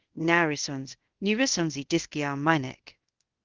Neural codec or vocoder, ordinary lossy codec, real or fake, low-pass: codec, 24 kHz, 0.5 kbps, DualCodec; Opus, 16 kbps; fake; 7.2 kHz